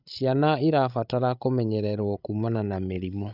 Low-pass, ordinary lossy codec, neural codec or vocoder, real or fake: 5.4 kHz; none; codec, 16 kHz, 16 kbps, FreqCodec, larger model; fake